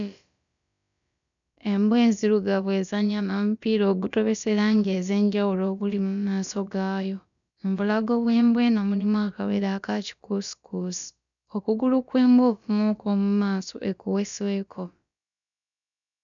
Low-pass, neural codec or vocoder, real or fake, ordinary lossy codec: 7.2 kHz; codec, 16 kHz, about 1 kbps, DyCAST, with the encoder's durations; fake; none